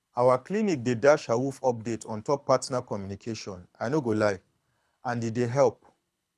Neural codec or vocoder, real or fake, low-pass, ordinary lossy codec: codec, 24 kHz, 6 kbps, HILCodec; fake; none; none